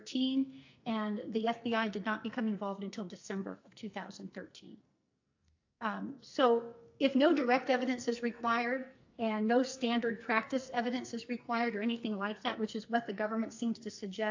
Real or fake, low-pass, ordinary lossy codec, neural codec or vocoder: fake; 7.2 kHz; AAC, 48 kbps; codec, 44.1 kHz, 2.6 kbps, SNAC